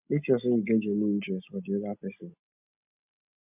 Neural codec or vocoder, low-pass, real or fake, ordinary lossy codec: none; 3.6 kHz; real; none